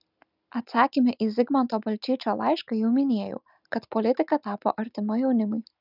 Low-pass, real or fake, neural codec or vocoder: 5.4 kHz; real; none